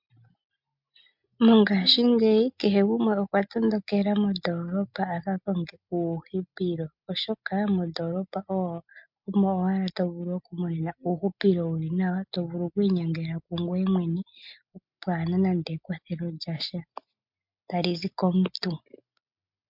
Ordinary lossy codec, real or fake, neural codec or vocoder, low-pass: MP3, 48 kbps; real; none; 5.4 kHz